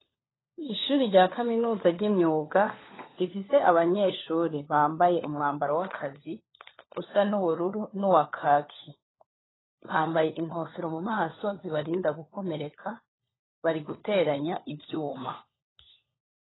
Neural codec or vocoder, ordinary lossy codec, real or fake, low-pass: codec, 16 kHz, 4 kbps, FunCodec, trained on LibriTTS, 50 frames a second; AAC, 16 kbps; fake; 7.2 kHz